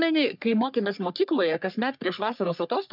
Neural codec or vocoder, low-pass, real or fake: codec, 44.1 kHz, 3.4 kbps, Pupu-Codec; 5.4 kHz; fake